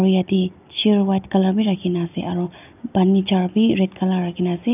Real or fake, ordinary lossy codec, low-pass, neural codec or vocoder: real; none; 3.6 kHz; none